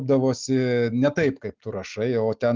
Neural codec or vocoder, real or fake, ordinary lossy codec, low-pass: none; real; Opus, 24 kbps; 7.2 kHz